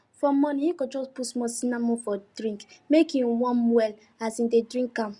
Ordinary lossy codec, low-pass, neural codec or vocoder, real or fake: Opus, 64 kbps; 10.8 kHz; none; real